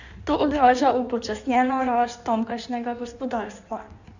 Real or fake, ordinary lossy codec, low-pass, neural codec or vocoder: fake; none; 7.2 kHz; codec, 16 kHz in and 24 kHz out, 1.1 kbps, FireRedTTS-2 codec